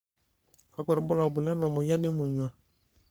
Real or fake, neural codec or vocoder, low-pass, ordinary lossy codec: fake; codec, 44.1 kHz, 3.4 kbps, Pupu-Codec; none; none